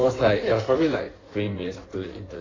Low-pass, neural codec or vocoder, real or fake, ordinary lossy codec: 7.2 kHz; codec, 16 kHz in and 24 kHz out, 1.1 kbps, FireRedTTS-2 codec; fake; AAC, 32 kbps